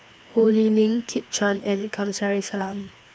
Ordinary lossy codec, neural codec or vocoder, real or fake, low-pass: none; codec, 16 kHz, 2 kbps, FreqCodec, larger model; fake; none